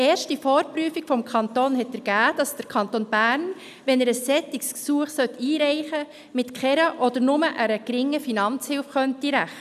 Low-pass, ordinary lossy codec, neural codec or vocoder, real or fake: 14.4 kHz; none; none; real